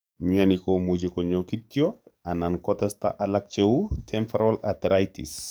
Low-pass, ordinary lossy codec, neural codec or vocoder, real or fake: none; none; codec, 44.1 kHz, 7.8 kbps, DAC; fake